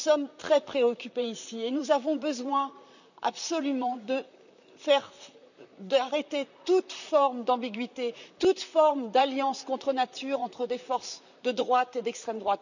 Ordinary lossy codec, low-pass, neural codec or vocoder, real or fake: none; 7.2 kHz; vocoder, 44.1 kHz, 128 mel bands, Pupu-Vocoder; fake